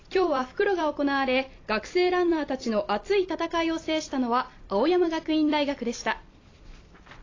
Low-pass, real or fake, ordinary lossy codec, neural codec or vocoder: 7.2 kHz; fake; AAC, 32 kbps; vocoder, 44.1 kHz, 128 mel bands every 256 samples, BigVGAN v2